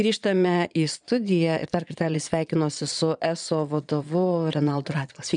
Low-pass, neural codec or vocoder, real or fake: 9.9 kHz; vocoder, 22.05 kHz, 80 mel bands, Vocos; fake